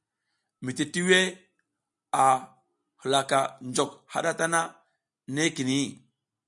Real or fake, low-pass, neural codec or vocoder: real; 10.8 kHz; none